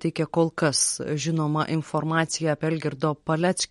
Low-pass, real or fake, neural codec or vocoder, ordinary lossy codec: 19.8 kHz; real; none; MP3, 48 kbps